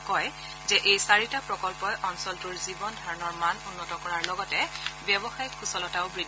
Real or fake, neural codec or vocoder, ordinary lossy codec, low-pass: real; none; none; none